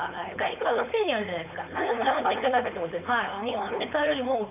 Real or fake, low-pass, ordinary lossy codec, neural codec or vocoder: fake; 3.6 kHz; none; codec, 16 kHz, 4.8 kbps, FACodec